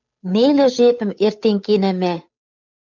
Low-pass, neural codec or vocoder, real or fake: 7.2 kHz; codec, 16 kHz, 8 kbps, FunCodec, trained on Chinese and English, 25 frames a second; fake